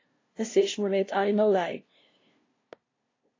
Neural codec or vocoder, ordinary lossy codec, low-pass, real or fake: codec, 16 kHz, 0.5 kbps, FunCodec, trained on LibriTTS, 25 frames a second; AAC, 32 kbps; 7.2 kHz; fake